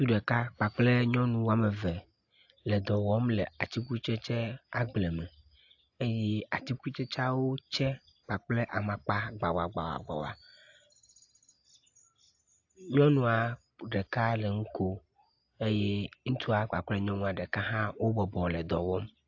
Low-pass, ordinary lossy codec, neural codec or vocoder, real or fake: 7.2 kHz; AAC, 48 kbps; none; real